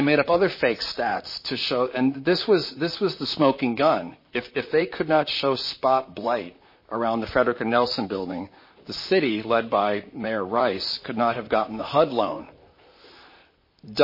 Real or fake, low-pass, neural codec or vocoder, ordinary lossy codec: fake; 5.4 kHz; vocoder, 44.1 kHz, 128 mel bands, Pupu-Vocoder; MP3, 24 kbps